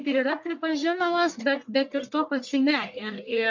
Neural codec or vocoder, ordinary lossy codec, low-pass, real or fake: codec, 44.1 kHz, 1.7 kbps, Pupu-Codec; MP3, 64 kbps; 7.2 kHz; fake